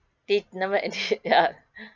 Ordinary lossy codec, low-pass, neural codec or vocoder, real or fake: none; 7.2 kHz; none; real